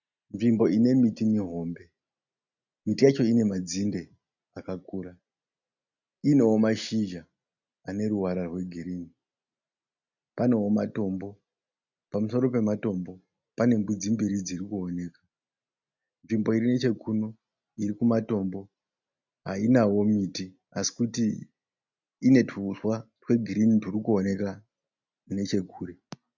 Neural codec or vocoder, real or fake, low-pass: none; real; 7.2 kHz